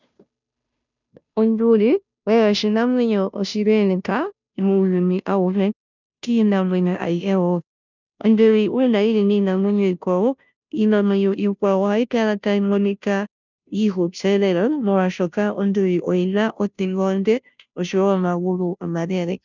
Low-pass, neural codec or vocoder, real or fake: 7.2 kHz; codec, 16 kHz, 0.5 kbps, FunCodec, trained on Chinese and English, 25 frames a second; fake